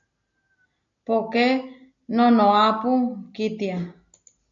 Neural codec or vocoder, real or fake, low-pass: none; real; 7.2 kHz